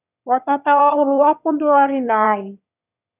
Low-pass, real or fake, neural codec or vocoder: 3.6 kHz; fake; autoencoder, 22.05 kHz, a latent of 192 numbers a frame, VITS, trained on one speaker